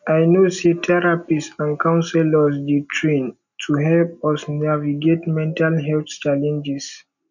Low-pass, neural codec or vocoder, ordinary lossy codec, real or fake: 7.2 kHz; none; none; real